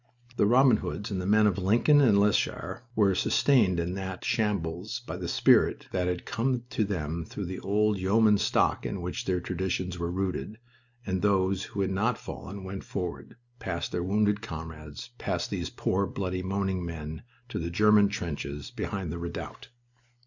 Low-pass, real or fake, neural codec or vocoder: 7.2 kHz; real; none